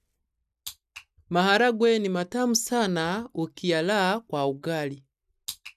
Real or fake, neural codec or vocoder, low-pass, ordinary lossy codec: real; none; 14.4 kHz; none